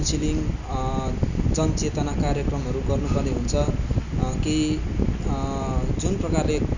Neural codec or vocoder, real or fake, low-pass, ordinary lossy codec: none; real; 7.2 kHz; none